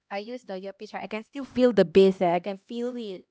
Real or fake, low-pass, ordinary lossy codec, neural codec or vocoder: fake; none; none; codec, 16 kHz, 1 kbps, X-Codec, HuBERT features, trained on LibriSpeech